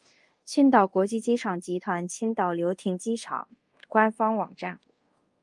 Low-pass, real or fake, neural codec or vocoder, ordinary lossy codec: 10.8 kHz; fake; codec, 24 kHz, 0.5 kbps, DualCodec; Opus, 32 kbps